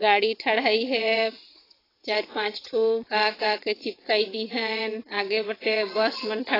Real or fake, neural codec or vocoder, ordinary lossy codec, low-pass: fake; vocoder, 22.05 kHz, 80 mel bands, WaveNeXt; AAC, 24 kbps; 5.4 kHz